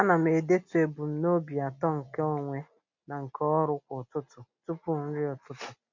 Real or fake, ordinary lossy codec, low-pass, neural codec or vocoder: real; MP3, 48 kbps; 7.2 kHz; none